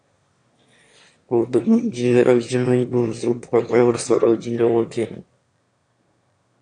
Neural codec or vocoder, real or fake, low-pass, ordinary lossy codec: autoencoder, 22.05 kHz, a latent of 192 numbers a frame, VITS, trained on one speaker; fake; 9.9 kHz; AAC, 48 kbps